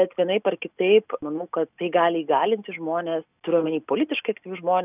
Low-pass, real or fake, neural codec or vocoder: 3.6 kHz; real; none